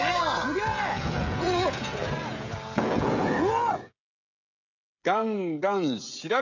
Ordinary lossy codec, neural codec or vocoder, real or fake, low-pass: none; codec, 16 kHz, 16 kbps, FreqCodec, smaller model; fake; 7.2 kHz